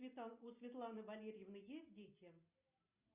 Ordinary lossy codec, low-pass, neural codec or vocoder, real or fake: Opus, 64 kbps; 3.6 kHz; none; real